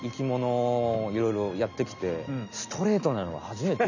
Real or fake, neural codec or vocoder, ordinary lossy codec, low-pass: real; none; none; 7.2 kHz